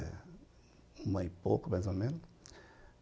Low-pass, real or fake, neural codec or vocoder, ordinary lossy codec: none; real; none; none